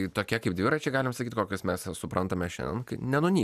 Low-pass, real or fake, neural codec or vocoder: 14.4 kHz; real; none